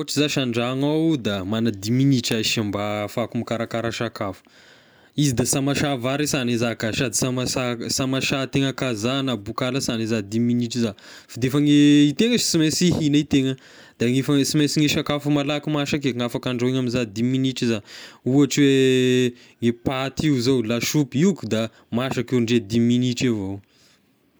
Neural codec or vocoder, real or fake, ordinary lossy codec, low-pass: none; real; none; none